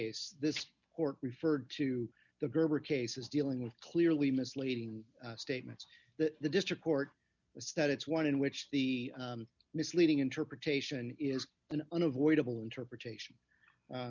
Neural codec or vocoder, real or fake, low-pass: none; real; 7.2 kHz